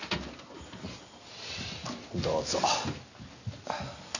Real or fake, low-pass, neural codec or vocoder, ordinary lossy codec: real; 7.2 kHz; none; none